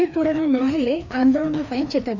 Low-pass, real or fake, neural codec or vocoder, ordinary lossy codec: 7.2 kHz; fake; codec, 16 kHz, 2 kbps, FreqCodec, larger model; none